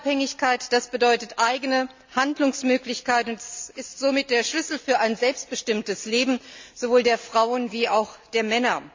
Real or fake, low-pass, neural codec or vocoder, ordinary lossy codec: real; 7.2 kHz; none; MP3, 64 kbps